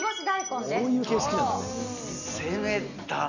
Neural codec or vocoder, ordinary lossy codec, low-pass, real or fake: none; none; 7.2 kHz; real